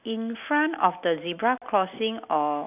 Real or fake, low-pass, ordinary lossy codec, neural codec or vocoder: real; 3.6 kHz; none; none